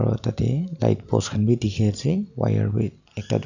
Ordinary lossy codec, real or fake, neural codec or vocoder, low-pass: none; real; none; 7.2 kHz